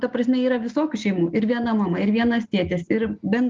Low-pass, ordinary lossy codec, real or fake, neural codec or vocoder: 7.2 kHz; Opus, 32 kbps; real; none